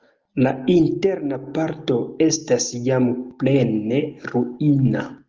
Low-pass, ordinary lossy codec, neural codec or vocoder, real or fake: 7.2 kHz; Opus, 24 kbps; none; real